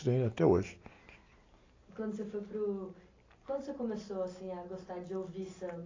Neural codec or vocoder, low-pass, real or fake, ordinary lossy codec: none; 7.2 kHz; real; AAC, 32 kbps